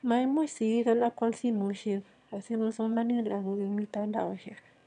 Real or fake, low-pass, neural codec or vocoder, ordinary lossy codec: fake; 9.9 kHz; autoencoder, 22.05 kHz, a latent of 192 numbers a frame, VITS, trained on one speaker; none